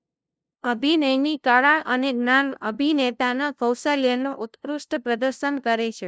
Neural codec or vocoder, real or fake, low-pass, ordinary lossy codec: codec, 16 kHz, 0.5 kbps, FunCodec, trained on LibriTTS, 25 frames a second; fake; none; none